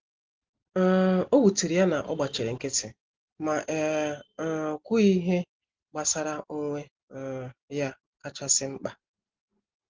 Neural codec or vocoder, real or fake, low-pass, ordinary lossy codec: none; real; 7.2 kHz; Opus, 16 kbps